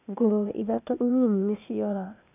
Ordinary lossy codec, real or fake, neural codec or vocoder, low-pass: none; fake; codec, 16 kHz, 0.8 kbps, ZipCodec; 3.6 kHz